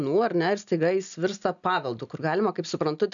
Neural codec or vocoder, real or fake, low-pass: none; real; 7.2 kHz